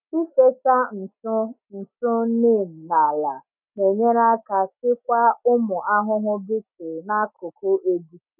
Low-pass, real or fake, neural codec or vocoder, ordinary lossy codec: 3.6 kHz; real; none; none